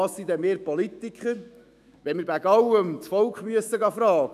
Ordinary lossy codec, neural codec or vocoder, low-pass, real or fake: none; autoencoder, 48 kHz, 128 numbers a frame, DAC-VAE, trained on Japanese speech; 14.4 kHz; fake